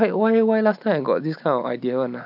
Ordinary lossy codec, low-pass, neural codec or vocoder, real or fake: none; 5.4 kHz; none; real